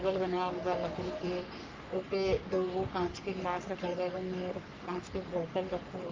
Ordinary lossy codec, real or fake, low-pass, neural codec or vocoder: Opus, 24 kbps; fake; 7.2 kHz; codec, 44.1 kHz, 3.4 kbps, Pupu-Codec